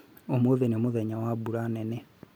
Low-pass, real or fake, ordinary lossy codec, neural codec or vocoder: none; real; none; none